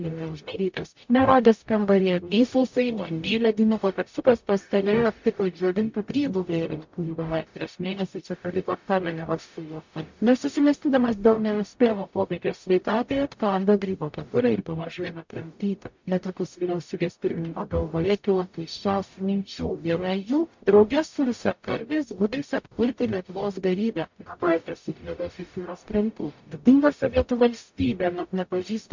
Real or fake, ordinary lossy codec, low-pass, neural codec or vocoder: fake; MP3, 48 kbps; 7.2 kHz; codec, 44.1 kHz, 0.9 kbps, DAC